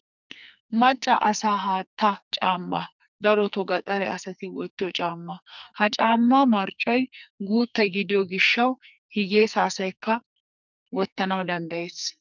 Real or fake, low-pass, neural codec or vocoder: fake; 7.2 kHz; codec, 44.1 kHz, 2.6 kbps, SNAC